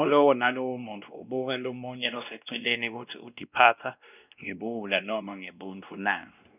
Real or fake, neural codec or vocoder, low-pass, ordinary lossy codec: fake; codec, 16 kHz, 1 kbps, X-Codec, WavLM features, trained on Multilingual LibriSpeech; 3.6 kHz; none